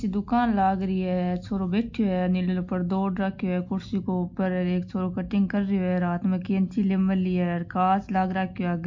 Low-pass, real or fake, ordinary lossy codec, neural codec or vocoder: 7.2 kHz; real; MP3, 48 kbps; none